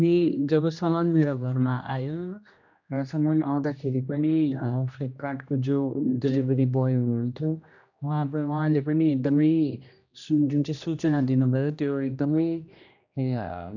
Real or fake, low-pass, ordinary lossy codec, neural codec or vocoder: fake; 7.2 kHz; none; codec, 16 kHz, 1 kbps, X-Codec, HuBERT features, trained on general audio